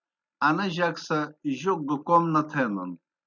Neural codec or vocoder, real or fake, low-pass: none; real; 7.2 kHz